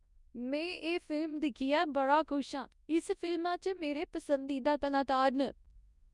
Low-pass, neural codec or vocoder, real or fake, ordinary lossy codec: 10.8 kHz; codec, 24 kHz, 0.9 kbps, WavTokenizer, large speech release; fake; none